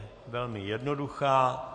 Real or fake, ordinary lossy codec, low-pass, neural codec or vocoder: real; MP3, 48 kbps; 10.8 kHz; none